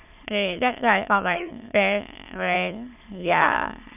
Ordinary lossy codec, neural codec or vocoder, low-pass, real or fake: none; autoencoder, 22.05 kHz, a latent of 192 numbers a frame, VITS, trained on many speakers; 3.6 kHz; fake